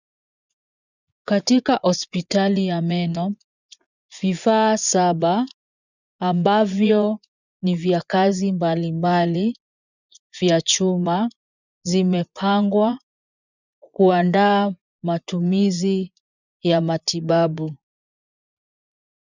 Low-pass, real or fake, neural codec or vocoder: 7.2 kHz; fake; vocoder, 24 kHz, 100 mel bands, Vocos